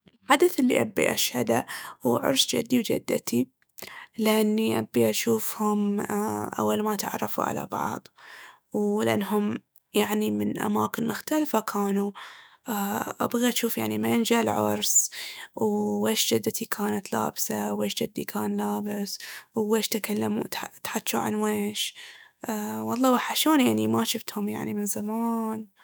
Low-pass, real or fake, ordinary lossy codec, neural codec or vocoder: none; fake; none; autoencoder, 48 kHz, 128 numbers a frame, DAC-VAE, trained on Japanese speech